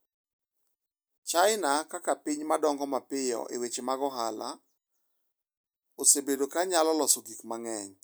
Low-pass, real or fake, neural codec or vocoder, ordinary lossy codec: none; real; none; none